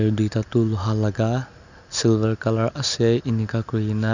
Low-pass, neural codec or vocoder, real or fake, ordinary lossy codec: 7.2 kHz; none; real; none